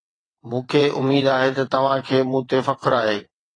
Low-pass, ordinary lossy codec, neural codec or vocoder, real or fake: 9.9 kHz; AAC, 32 kbps; vocoder, 22.05 kHz, 80 mel bands, WaveNeXt; fake